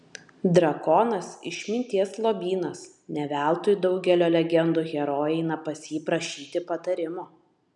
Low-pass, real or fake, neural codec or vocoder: 10.8 kHz; real; none